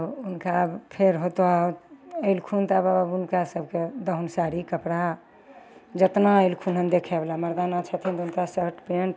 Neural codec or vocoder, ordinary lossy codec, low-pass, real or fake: none; none; none; real